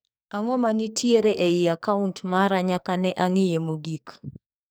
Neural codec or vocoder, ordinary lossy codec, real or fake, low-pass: codec, 44.1 kHz, 2.6 kbps, SNAC; none; fake; none